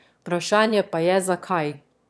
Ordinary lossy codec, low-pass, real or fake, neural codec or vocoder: none; none; fake; autoencoder, 22.05 kHz, a latent of 192 numbers a frame, VITS, trained on one speaker